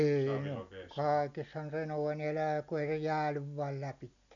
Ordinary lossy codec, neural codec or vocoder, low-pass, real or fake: none; none; 7.2 kHz; real